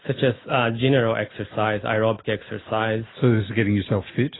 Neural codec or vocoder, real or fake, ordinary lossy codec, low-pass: none; real; AAC, 16 kbps; 7.2 kHz